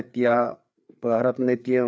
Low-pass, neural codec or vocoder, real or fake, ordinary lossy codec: none; codec, 16 kHz, 4 kbps, FreqCodec, larger model; fake; none